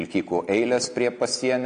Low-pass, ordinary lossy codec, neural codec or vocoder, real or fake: 9.9 kHz; MP3, 64 kbps; none; real